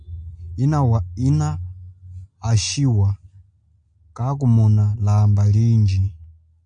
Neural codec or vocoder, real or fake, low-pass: none; real; 9.9 kHz